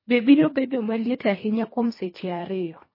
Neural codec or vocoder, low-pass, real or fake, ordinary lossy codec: codec, 24 kHz, 1.5 kbps, HILCodec; 5.4 kHz; fake; MP3, 24 kbps